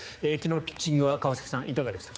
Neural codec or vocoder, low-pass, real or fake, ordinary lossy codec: codec, 16 kHz, 4 kbps, X-Codec, HuBERT features, trained on general audio; none; fake; none